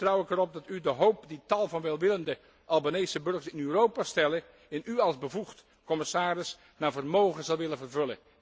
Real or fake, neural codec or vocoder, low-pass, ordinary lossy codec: real; none; none; none